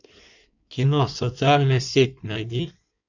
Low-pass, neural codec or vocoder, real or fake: 7.2 kHz; codec, 16 kHz in and 24 kHz out, 1.1 kbps, FireRedTTS-2 codec; fake